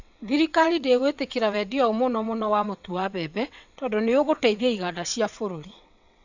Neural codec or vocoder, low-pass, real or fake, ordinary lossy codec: vocoder, 22.05 kHz, 80 mel bands, WaveNeXt; 7.2 kHz; fake; none